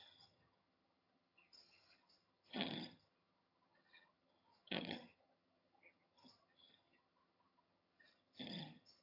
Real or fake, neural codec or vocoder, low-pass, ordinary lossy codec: fake; vocoder, 22.05 kHz, 80 mel bands, HiFi-GAN; 5.4 kHz; AAC, 32 kbps